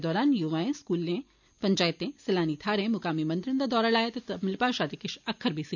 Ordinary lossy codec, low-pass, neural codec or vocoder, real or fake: none; 7.2 kHz; none; real